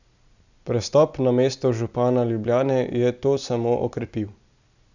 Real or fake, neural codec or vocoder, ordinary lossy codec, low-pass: real; none; none; 7.2 kHz